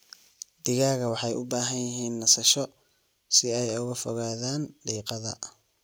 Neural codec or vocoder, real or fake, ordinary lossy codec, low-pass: none; real; none; none